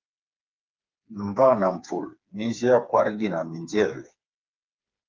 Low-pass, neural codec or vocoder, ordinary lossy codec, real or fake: 7.2 kHz; codec, 16 kHz, 4 kbps, FreqCodec, smaller model; Opus, 24 kbps; fake